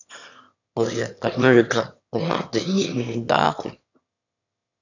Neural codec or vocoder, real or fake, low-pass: autoencoder, 22.05 kHz, a latent of 192 numbers a frame, VITS, trained on one speaker; fake; 7.2 kHz